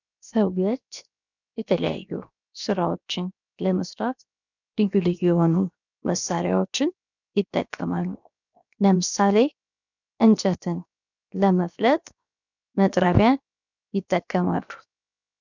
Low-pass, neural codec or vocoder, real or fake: 7.2 kHz; codec, 16 kHz, 0.7 kbps, FocalCodec; fake